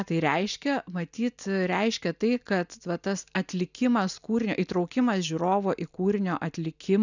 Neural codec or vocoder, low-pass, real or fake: none; 7.2 kHz; real